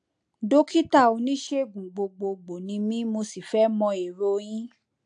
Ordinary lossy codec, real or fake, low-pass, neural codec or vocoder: AAC, 64 kbps; real; 9.9 kHz; none